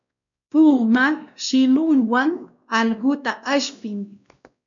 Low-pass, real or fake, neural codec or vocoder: 7.2 kHz; fake; codec, 16 kHz, 1 kbps, X-Codec, WavLM features, trained on Multilingual LibriSpeech